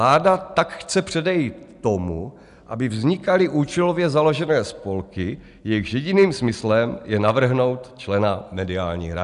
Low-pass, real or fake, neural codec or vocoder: 10.8 kHz; real; none